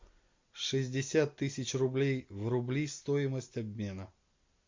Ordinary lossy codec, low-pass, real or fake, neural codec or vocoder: MP3, 64 kbps; 7.2 kHz; real; none